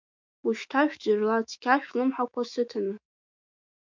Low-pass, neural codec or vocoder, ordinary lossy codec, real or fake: 7.2 kHz; autoencoder, 48 kHz, 128 numbers a frame, DAC-VAE, trained on Japanese speech; MP3, 64 kbps; fake